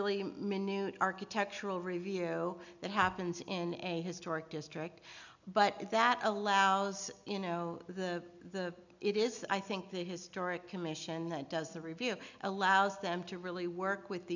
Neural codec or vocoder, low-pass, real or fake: none; 7.2 kHz; real